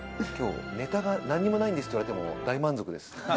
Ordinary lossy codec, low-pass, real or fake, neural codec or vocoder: none; none; real; none